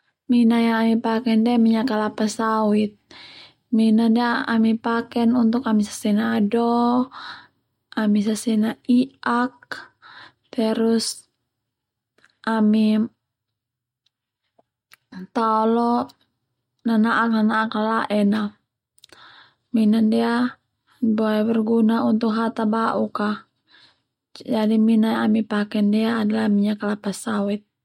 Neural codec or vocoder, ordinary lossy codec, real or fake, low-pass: none; MP3, 64 kbps; real; 19.8 kHz